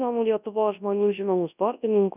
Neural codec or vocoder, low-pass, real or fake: codec, 24 kHz, 0.9 kbps, WavTokenizer, large speech release; 3.6 kHz; fake